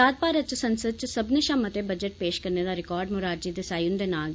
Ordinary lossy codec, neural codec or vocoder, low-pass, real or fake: none; none; none; real